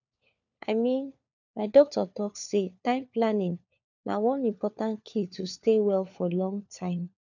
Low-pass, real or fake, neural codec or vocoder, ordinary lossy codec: 7.2 kHz; fake; codec, 16 kHz, 4 kbps, FunCodec, trained on LibriTTS, 50 frames a second; none